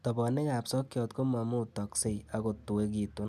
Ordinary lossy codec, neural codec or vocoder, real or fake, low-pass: none; none; real; 14.4 kHz